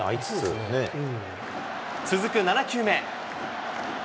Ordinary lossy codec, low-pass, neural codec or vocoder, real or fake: none; none; none; real